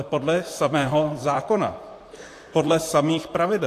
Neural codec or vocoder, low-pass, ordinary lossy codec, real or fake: vocoder, 44.1 kHz, 128 mel bands, Pupu-Vocoder; 14.4 kHz; AAC, 64 kbps; fake